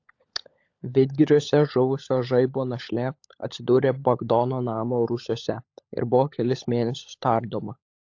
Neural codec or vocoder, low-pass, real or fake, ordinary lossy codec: codec, 16 kHz, 16 kbps, FunCodec, trained on LibriTTS, 50 frames a second; 7.2 kHz; fake; AAC, 48 kbps